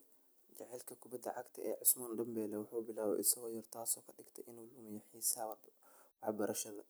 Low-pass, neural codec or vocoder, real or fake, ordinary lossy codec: none; none; real; none